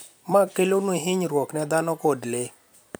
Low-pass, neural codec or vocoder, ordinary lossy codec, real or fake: none; vocoder, 44.1 kHz, 128 mel bands every 256 samples, BigVGAN v2; none; fake